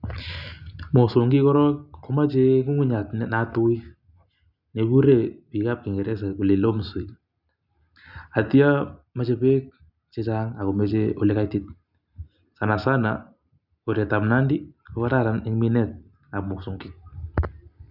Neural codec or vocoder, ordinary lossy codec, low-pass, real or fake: none; none; 5.4 kHz; real